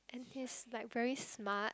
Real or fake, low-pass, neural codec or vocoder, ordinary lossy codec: real; none; none; none